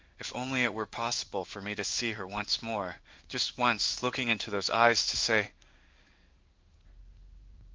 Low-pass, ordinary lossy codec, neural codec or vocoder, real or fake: 7.2 kHz; Opus, 32 kbps; codec, 16 kHz in and 24 kHz out, 1 kbps, XY-Tokenizer; fake